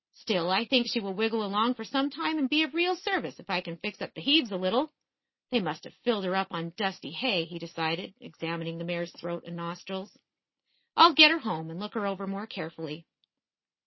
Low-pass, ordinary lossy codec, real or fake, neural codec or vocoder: 7.2 kHz; MP3, 24 kbps; real; none